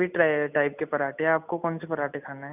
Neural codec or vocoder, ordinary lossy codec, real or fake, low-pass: none; none; real; 3.6 kHz